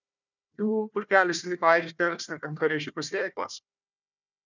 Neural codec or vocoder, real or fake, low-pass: codec, 16 kHz, 1 kbps, FunCodec, trained on Chinese and English, 50 frames a second; fake; 7.2 kHz